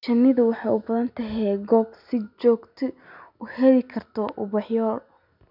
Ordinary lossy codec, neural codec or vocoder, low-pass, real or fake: none; none; 5.4 kHz; real